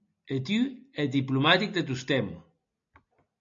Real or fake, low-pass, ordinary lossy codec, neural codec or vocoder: real; 7.2 kHz; MP3, 48 kbps; none